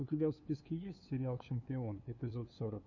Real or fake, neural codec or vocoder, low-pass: fake; codec, 16 kHz, 2 kbps, FunCodec, trained on LibriTTS, 25 frames a second; 7.2 kHz